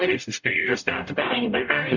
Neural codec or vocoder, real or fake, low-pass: codec, 44.1 kHz, 0.9 kbps, DAC; fake; 7.2 kHz